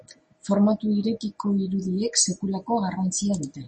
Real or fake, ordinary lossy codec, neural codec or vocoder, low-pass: real; MP3, 32 kbps; none; 10.8 kHz